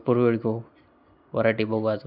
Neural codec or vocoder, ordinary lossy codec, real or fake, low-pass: none; none; real; 5.4 kHz